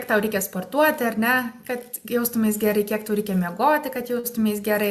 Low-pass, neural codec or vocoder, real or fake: 14.4 kHz; none; real